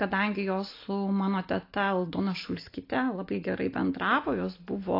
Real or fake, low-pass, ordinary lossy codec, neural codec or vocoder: real; 5.4 kHz; AAC, 32 kbps; none